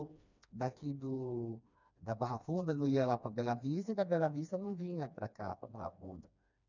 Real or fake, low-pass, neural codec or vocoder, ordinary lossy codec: fake; 7.2 kHz; codec, 16 kHz, 2 kbps, FreqCodec, smaller model; none